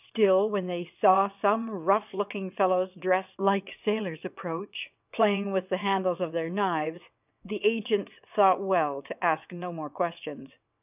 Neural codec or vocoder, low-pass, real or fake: vocoder, 22.05 kHz, 80 mel bands, WaveNeXt; 3.6 kHz; fake